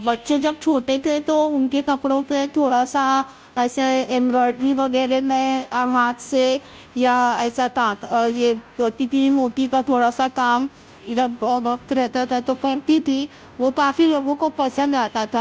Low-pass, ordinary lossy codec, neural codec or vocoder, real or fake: none; none; codec, 16 kHz, 0.5 kbps, FunCodec, trained on Chinese and English, 25 frames a second; fake